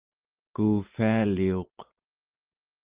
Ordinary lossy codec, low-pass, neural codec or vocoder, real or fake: Opus, 32 kbps; 3.6 kHz; none; real